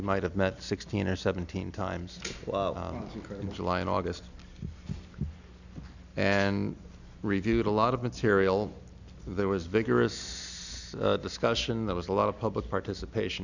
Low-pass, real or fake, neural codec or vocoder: 7.2 kHz; real; none